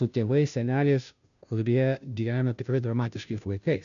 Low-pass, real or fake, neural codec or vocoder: 7.2 kHz; fake; codec, 16 kHz, 0.5 kbps, FunCodec, trained on Chinese and English, 25 frames a second